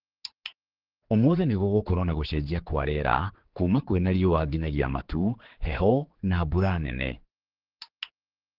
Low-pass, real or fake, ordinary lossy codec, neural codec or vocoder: 5.4 kHz; fake; Opus, 16 kbps; codec, 16 kHz, 4 kbps, X-Codec, HuBERT features, trained on general audio